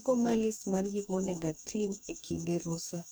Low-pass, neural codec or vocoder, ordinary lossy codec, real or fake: none; codec, 44.1 kHz, 2.6 kbps, DAC; none; fake